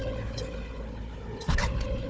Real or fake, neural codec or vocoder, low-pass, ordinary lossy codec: fake; codec, 16 kHz, 4 kbps, FunCodec, trained on Chinese and English, 50 frames a second; none; none